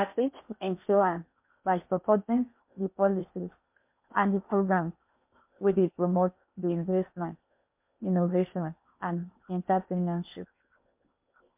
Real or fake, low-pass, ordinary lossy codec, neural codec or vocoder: fake; 3.6 kHz; MP3, 32 kbps; codec, 16 kHz in and 24 kHz out, 0.6 kbps, FocalCodec, streaming, 4096 codes